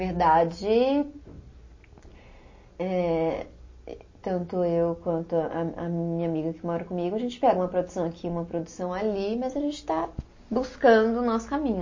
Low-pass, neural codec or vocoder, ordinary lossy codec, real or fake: 7.2 kHz; none; MP3, 32 kbps; real